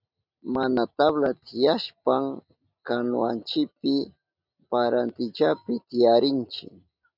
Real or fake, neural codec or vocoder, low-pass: real; none; 5.4 kHz